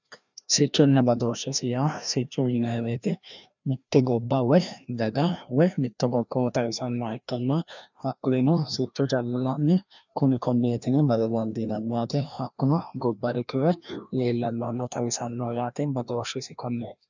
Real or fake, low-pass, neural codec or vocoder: fake; 7.2 kHz; codec, 16 kHz, 1 kbps, FreqCodec, larger model